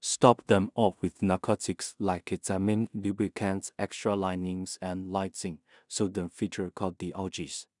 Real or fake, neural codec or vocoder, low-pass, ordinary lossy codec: fake; codec, 16 kHz in and 24 kHz out, 0.4 kbps, LongCat-Audio-Codec, two codebook decoder; 10.8 kHz; none